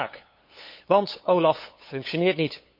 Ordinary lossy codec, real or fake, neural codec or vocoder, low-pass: MP3, 32 kbps; fake; codec, 16 kHz, 8 kbps, FunCodec, trained on LibriTTS, 25 frames a second; 5.4 kHz